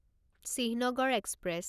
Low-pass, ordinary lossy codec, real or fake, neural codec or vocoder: 14.4 kHz; none; real; none